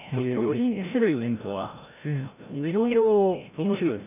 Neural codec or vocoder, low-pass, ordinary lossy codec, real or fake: codec, 16 kHz, 0.5 kbps, FreqCodec, larger model; 3.6 kHz; none; fake